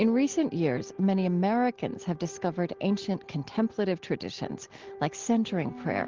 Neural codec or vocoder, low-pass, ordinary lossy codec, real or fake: none; 7.2 kHz; Opus, 16 kbps; real